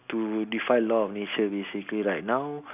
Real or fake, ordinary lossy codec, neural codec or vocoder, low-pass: real; none; none; 3.6 kHz